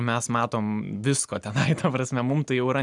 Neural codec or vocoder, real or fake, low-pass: none; real; 10.8 kHz